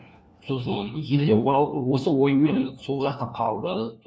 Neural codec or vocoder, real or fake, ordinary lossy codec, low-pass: codec, 16 kHz, 1 kbps, FunCodec, trained on LibriTTS, 50 frames a second; fake; none; none